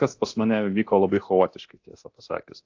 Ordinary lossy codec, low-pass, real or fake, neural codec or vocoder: AAC, 48 kbps; 7.2 kHz; fake; codec, 24 kHz, 0.9 kbps, DualCodec